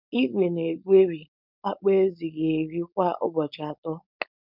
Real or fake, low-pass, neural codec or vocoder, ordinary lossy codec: fake; 5.4 kHz; codec, 16 kHz, 4.8 kbps, FACodec; none